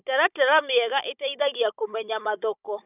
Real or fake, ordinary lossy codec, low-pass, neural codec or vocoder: fake; none; 3.6 kHz; codec, 16 kHz, 16 kbps, FunCodec, trained on Chinese and English, 50 frames a second